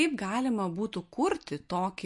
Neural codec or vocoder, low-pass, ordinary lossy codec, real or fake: none; 10.8 kHz; MP3, 48 kbps; real